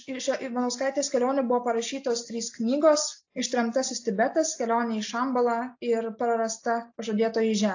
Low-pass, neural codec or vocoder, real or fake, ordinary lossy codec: 7.2 kHz; none; real; AAC, 48 kbps